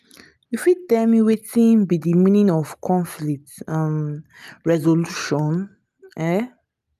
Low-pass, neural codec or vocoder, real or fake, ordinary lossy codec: 14.4 kHz; none; real; none